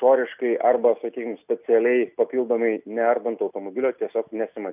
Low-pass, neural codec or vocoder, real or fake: 3.6 kHz; none; real